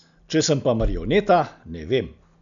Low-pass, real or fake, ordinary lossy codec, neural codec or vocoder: 7.2 kHz; real; none; none